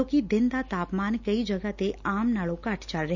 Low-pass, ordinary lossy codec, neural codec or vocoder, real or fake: 7.2 kHz; none; none; real